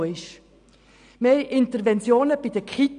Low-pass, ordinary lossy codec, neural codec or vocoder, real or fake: 9.9 kHz; none; none; real